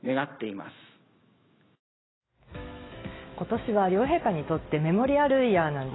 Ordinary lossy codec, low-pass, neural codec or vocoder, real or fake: AAC, 16 kbps; 7.2 kHz; autoencoder, 48 kHz, 128 numbers a frame, DAC-VAE, trained on Japanese speech; fake